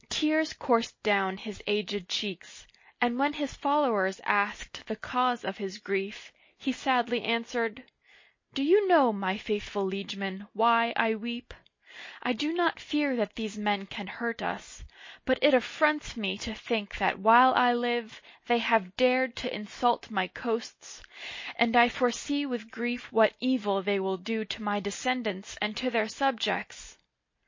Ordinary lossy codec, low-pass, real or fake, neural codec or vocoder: MP3, 32 kbps; 7.2 kHz; real; none